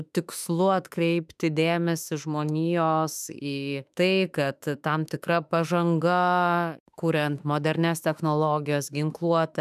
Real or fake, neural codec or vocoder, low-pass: fake; autoencoder, 48 kHz, 32 numbers a frame, DAC-VAE, trained on Japanese speech; 14.4 kHz